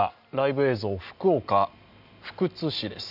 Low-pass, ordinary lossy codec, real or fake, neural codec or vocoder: 5.4 kHz; none; real; none